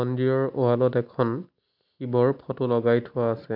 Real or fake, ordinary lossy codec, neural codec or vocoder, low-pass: real; MP3, 48 kbps; none; 5.4 kHz